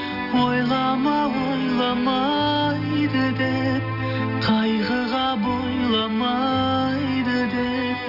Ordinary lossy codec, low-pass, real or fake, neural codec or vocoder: none; 5.4 kHz; real; none